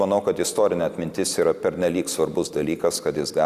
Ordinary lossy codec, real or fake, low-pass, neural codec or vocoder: Opus, 64 kbps; real; 14.4 kHz; none